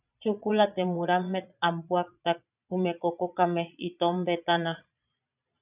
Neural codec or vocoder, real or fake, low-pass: vocoder, 22.05 kHz, 80 mel bands, Vocos; fake; 3.6 kHz